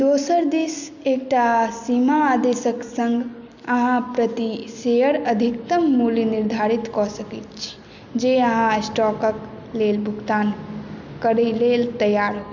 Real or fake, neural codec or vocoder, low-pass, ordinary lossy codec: real; none; 7.2 kHz; none